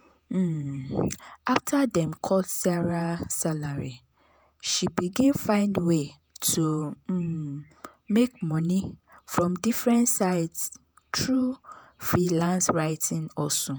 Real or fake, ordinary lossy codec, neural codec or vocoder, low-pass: fake; none; vocoder, 48 kHz, 128 mel bands, Vocos; none